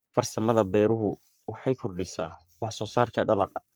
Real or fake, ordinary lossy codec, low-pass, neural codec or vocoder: fake; none; none; codec, 44.1 kHz, 3.4 kbps, Pupu-Codec